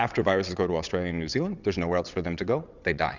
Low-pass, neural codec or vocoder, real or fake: 7.2 kHz; vocoder, 22.05 kHz, 80 mel bands, WaveNeXt; fake